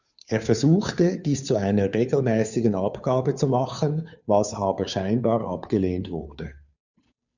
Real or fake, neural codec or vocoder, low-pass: fake; codec, 16 kHz, 2 kbps, FunCodec, trained on Chinese and English, 25 frames a second; 7.2 kHz